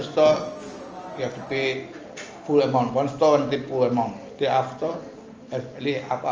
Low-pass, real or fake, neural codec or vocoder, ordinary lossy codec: 7.2 kHz; real; none; Opus, 24 kbps